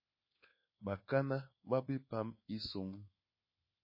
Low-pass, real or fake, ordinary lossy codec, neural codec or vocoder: 5.4 kHz; fake; MP3, 24 kbps; codec, 24 kHz, 1.2 kbps, DualCodec